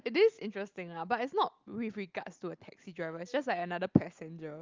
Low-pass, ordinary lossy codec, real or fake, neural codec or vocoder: 7.2 kHz; Opus, 32 kbps; real; none